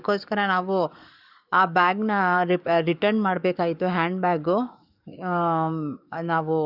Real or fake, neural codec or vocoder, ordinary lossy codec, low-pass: fake; codec, 44.1 kHz, 7.8 kbps, DAC; none; 5.4 kHz